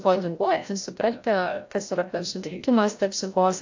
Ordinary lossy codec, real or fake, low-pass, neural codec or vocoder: AAC, 48 kbps; fake; 7.2 kHz; codec, 16 kHz, 0.5 kbps, FreqCodec, larger model